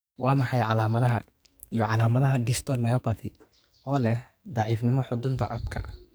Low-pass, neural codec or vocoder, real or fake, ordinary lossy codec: none; codec, 44.1 kHz, 2.6 kbps, SNAC; fake; none